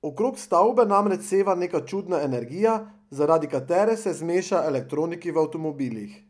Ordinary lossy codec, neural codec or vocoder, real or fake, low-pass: none; none; real; none